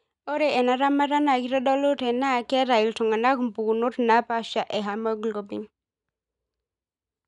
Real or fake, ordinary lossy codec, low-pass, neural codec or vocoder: real; none; 10.8 kHz; none